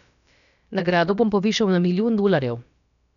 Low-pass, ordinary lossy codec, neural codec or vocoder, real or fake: 7.2 kHz; none; codec, 16 kHz, about 1 kbps, DyCAST, with the encoder's durations; fake